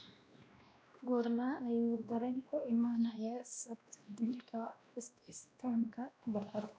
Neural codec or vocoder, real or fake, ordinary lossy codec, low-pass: codec, 16 kHz, 1 kbps, X-Codec, WavLM features, trained on Multilingual LibriSpeech; fake; none; none